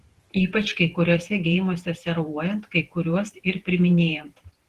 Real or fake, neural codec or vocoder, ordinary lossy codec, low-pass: fake; vocoder, 48 kHz, 128 mel bands, Vocos; Opus, 16 kbps; 14.4 kHz